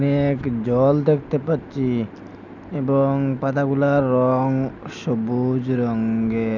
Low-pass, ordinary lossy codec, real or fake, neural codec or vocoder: 7.2 kHz; none; real; none